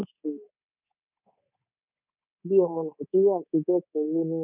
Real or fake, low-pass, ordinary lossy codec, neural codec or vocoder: fake; 3.6 kHz; none; codec, 24 kHz, 3.1 kbps, DualCodec